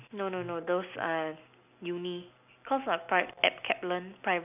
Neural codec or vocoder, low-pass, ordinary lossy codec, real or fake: none; 3.6 kHz; none; real